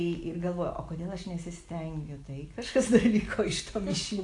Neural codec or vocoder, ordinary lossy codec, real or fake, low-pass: none; AAC, 48 kbps; real; 10.8 kHz